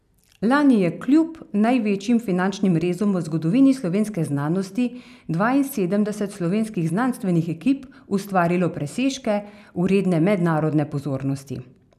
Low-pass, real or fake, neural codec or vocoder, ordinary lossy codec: 14.4 kHz; real; none; none